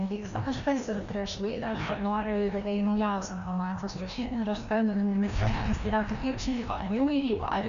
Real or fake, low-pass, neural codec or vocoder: fake; 7.2 kHz; codec, 16 kHz, 1 kbps, FreqCodec, larger model